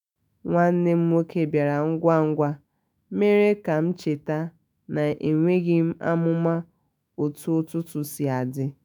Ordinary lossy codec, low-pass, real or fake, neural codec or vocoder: none; none; fake; autoencoder, 48 kHz, 128 numbers a frame, DAC-VAE, trained on Japanese speech